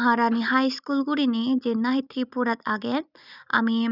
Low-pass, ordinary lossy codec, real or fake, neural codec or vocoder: 5.4 kHz; none; real; none